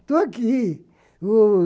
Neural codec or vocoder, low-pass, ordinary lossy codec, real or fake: none; none; none; real